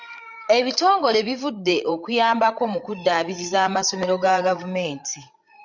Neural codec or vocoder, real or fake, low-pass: codec, 16 kHz, 16 kbps, FreqCodec, larger model; fake; 7.2 kHz